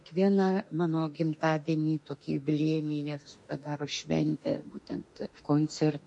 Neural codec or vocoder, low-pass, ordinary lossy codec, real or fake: autoencoder, 48 kHz, 32 numbers a frame, DAC-VAE, trained on Japanese speech; 10.8 kHz; MP3, 48 kbps; fake